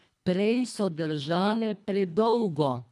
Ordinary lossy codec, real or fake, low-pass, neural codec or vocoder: none; fake; none; codec, 24 kHz, 1.5 kbps, HILCodec